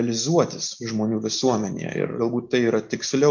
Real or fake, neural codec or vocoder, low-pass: real; none; 7.2 kHz